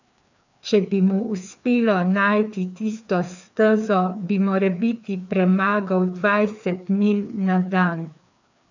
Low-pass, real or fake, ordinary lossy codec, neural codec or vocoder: 7.2 kHz; fake; none; codec, 16 kHz, 2 kbps, FreqCodec, larger model